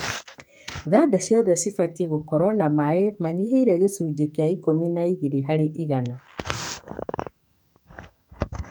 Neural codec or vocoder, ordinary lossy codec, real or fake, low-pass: codec, 44.1 kHz, 2.6 kbps, SNAC; none; fake; none